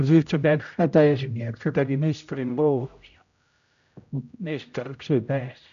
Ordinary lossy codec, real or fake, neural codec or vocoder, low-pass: AAC, 96 kbps; fake; codec, 16 kHz, 0.5 kbps, X-Codec, HuBERT features, trained on general audio; 7.2 kHz